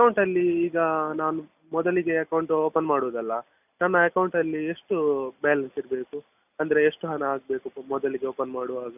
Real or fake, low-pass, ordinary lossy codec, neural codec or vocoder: real; 3.6 kHz; none; none